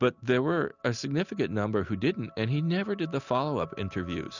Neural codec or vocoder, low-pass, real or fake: none; 7.2 kHz; real